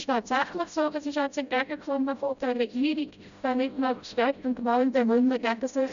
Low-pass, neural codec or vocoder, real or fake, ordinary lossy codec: 7.2 kHz; codec, 16 kHz, 0.5 kbps, FreqCodec, smaller model; fake; none